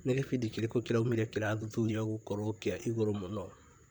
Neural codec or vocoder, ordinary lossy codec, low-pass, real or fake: vocoder, 44.1 kHz, 128 mel bands, Pupu-Vocoder; none; none; fake